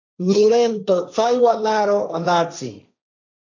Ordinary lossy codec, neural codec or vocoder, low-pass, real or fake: MP3, 64 kbps; codec, 16 kHz, 1.1 kbps, Voila-Tokenizer; 7.2 kHz; fake